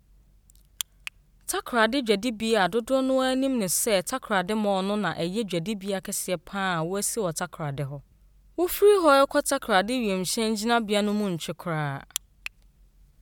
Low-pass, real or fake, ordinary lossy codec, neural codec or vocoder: none; real; none; none